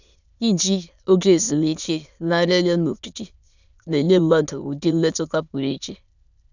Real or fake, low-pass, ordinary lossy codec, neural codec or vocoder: fake; 7.2 kHz; none; autoencoder, 22.05 kHz, a latent of 192 numbers a frame, VITS, trained on many speakers